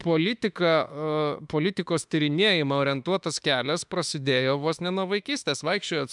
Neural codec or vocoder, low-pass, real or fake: autoencoder, 48 kHz, 32 numbers a frame, DAC-VAE, trained on Japanese speech; 10.8 kHz; fake